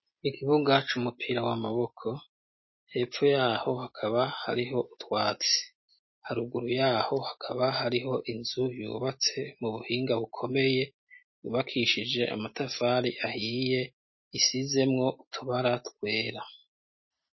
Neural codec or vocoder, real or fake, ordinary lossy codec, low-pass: none; real; MP3, 24 kbps; 7.2 kHz